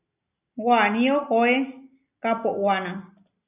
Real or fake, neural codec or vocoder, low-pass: real; none; 3.6 kHz